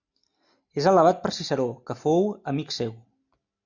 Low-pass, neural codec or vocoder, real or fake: 7.2 kHz; none; real